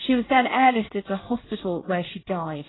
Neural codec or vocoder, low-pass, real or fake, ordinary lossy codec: codec, 24 kHz, 1 kbps, SNAC; 7.2 kHz; fake; AAC, 16 kbps